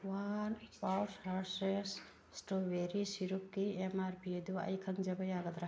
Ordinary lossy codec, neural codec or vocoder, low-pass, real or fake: none; none; none; real